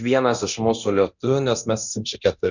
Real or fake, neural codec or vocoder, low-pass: fake; codec, 24 kHz, 0.9 kbps, DualCodec; 7.2 kHz